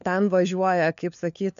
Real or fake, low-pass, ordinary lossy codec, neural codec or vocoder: fake; 7.2 kHz; MP3, 64 kbps; codec, 16 kHz, 4 kbps, FunCodec, trained on LibriTTS, 50 frames a second